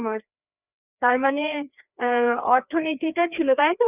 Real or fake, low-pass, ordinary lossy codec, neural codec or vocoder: fake; 3.6 kHz; none; codec, 16 kHz, 2 kbps, FreqCodec, larger model